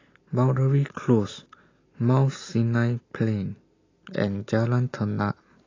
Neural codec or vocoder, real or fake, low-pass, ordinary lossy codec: none; real; 7.2 kHz; AAC, 32 kbps